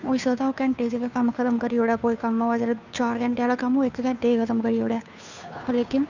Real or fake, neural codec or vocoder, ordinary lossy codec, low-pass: fake; codec, 16 kHz, 2 kbps, FunCodec, trained on Chinese and English, 25 frames a second; none; 7.2 kHz